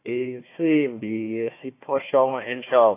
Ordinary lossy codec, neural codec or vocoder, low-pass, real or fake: AAC, 24 kbps; codec, 16 kHz, 1 kbps, FunCodec, trained on Chinese and English, 50 frames a second; 3.6 kHz; fake